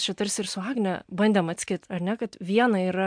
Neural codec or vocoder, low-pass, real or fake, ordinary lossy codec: none; 9.9 kHz; real; MP3, 64 kbps